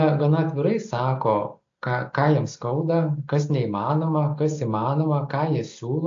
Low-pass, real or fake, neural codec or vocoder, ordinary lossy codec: 7.2 kHz; real; none; AAC, 48 kbps